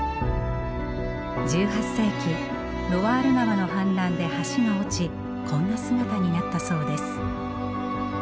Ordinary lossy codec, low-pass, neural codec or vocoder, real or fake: none; none; none; real